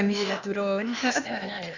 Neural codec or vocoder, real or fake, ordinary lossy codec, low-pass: codec, 16 kHz, 0.8 kbps, ZipCodec; fake; Opus, 64 kbps; 7.2 kHz